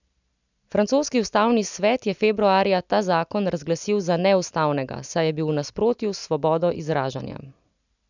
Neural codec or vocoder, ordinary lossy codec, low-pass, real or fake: none; none; 7.2 kHz; real